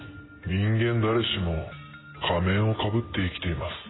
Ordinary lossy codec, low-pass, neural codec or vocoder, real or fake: AAC, 16 kbps; 7.2 kHz; none; real